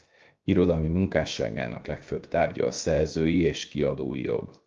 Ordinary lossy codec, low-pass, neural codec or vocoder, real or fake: Opus, 32 kbps; 7.2 kHz; codec, 16 kHz, 0.7 kbps, FocalCodec; fake